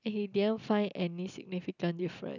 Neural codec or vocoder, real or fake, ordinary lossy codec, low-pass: none; real; none; 7.2 kHz